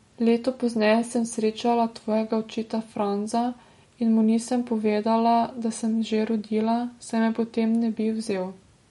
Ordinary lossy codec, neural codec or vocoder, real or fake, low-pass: MP3, 48 kbps; none; real; 19.8 kHz